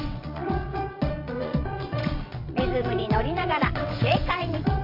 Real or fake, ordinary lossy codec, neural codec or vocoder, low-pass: fake; MP3, 32 kbps; vocoder, 22.05 kHz, 80 mel bands, WaveNeXt; 5.4 kHz